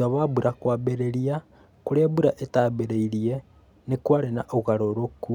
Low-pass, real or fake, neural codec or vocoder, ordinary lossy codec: 19.8 kHz; real; none; none